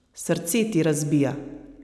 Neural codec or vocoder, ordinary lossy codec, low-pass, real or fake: none; none; none; real